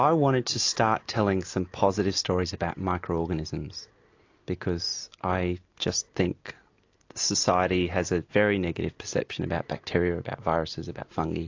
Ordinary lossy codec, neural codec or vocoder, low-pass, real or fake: AAC, 48 kbps; none; 7.2 kHz; real